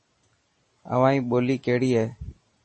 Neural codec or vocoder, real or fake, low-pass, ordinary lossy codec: none; real; 10.8 kHz; MP3, 32 kbps